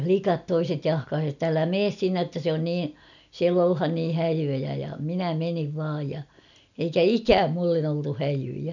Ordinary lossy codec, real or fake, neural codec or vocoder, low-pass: none; real; none; 7.2 kHz